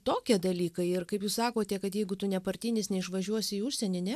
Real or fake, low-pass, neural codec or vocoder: real; 14.4 kHz; none